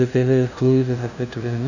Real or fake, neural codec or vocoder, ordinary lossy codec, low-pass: fake; codec, 16 kHz, 0.5 kbps, FunCodec, trained on LibriTTS, 25 frames a second; none; 7.2 kHz